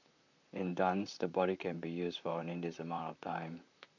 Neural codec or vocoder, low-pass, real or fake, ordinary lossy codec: vocoder, 44.1 kHz, 128 mel bands, Pupu-Vocoder; 7.2 kHz; fake; none